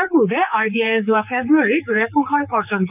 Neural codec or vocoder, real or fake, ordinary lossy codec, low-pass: codec, 16 kHz, 4 kbps, X-Codec, HuBERT features, trained on general audio; fake; none; 3.6 kHz